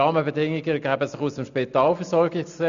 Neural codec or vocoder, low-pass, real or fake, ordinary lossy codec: none; 7.2 kHz; real; none